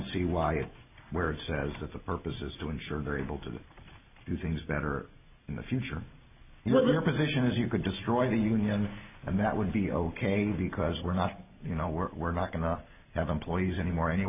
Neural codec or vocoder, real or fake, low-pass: none; real; 3.6 kHz